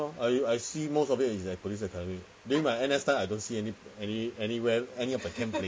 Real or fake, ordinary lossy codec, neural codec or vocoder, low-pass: real; none; none; none